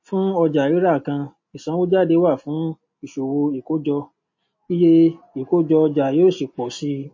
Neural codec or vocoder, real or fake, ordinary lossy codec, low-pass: none; real; MP3, 32 kbps; 7.2 kHz